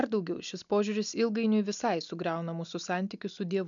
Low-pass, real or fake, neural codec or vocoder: 7.2 kHz; real; none